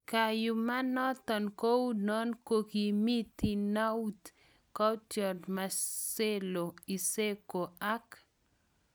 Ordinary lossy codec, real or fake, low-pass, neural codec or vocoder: none; real; none; none